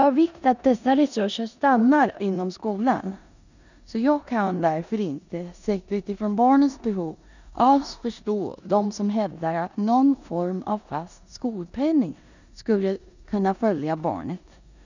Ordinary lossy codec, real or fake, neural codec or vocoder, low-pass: none; fake; codec, 16 kHz in and 24 kHz out, 0.9 kbps, LongCat-Audio-Codec, four codebook decoder; 7.2 kHz